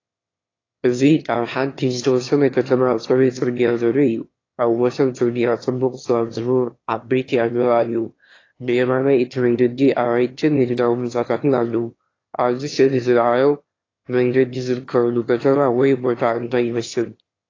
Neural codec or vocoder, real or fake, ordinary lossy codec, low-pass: autoencoder, 22.05 kHz, a latent of 192 numbers a frame, VITS, trained on one speaker; fake; AAC, 32 kbps; 7.2 kHz